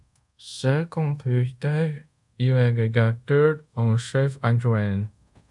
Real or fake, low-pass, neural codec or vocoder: fake; 10.8 kHz; codec, 24 kHz, 0.5 kbps, DualCodec